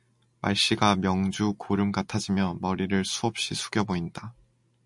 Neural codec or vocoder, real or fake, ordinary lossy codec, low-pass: none; real; MP3, 64 kbps; 10.8 kHz